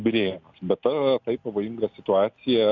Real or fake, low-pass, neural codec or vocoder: real; 7.2 kHz; none